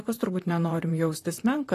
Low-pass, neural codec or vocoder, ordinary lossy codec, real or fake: 14.4 kHz; vocoder, 44.1 kHz, 128 mel bands, Pupu-Vocoder; AAC, 48 kbps; fake